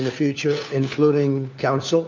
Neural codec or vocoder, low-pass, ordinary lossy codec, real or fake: codec, 16 kHz, 4 kbps, FunCodec, trained on LibriTTS, 50 frames a second; 7.2 kHz; MP3, 48 kbps; fake